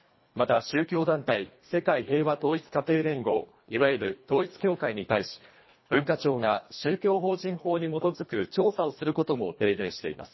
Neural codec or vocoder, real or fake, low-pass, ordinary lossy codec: codec, 24 kHz, 1.5 kbps, HILCodec; fake; 7.2 kHz; MP3, 24 kbps